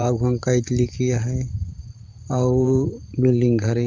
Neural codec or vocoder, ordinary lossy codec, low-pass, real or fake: vocoder, 44.1 kHz, 128 mel bands every 512 samples, BigVGAN v2; Opus, 32 kbps; 7.2 kHz; fake